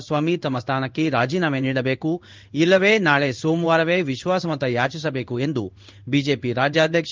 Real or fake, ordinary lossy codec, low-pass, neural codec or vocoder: fake; Opus, 24 kbps; 7.2 kHz; codec, 16 kHz in and 24 kHz out, 1 kbps, XY-Tokenizer